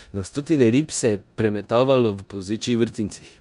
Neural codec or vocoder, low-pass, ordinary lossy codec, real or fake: codec, 16 kHz in and 24 kHz out, 0.9 kbps, LongCat-Audio-Codec, four codebook decoder; 10.8 kHz; none; fake